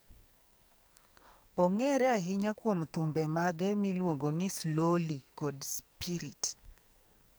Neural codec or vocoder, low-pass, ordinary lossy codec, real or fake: codec, 44.1 kHz, 2.6 kbps, SNAC; none; none; fake